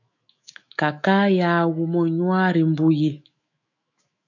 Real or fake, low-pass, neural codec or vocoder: fake; 7.2 kHz; autoencoder, 48 kHz, 128 numbers a frame, DAC-VAE, trained on Japanese speech